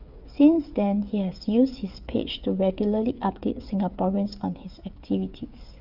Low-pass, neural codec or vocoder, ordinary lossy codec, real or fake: 5.4 kHz; codec, 16 kHz, 8 kbps, FreqCodec, smaller model; Opus, 64 kbps; fake